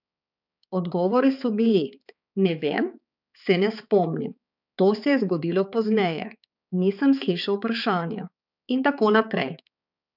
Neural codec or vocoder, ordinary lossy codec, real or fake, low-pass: codec, 16 kHz, 4 kbps, X-Codec, HuBERT features, trained on balanced general audio; none; fake; 5.4 kHz